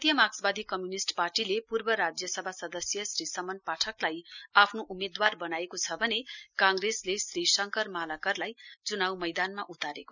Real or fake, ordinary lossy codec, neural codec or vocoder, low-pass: real; none; none; 7.2 kHz